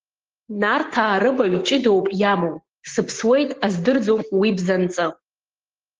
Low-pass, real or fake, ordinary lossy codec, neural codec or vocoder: 7.2 kHz; fake; Opus, 16 kbps; codec, 16 kHz, 6 kbps, DAC